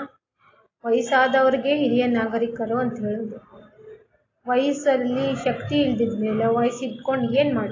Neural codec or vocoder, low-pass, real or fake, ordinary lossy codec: none; 7.2 kHz; real; AAC, 32 kbps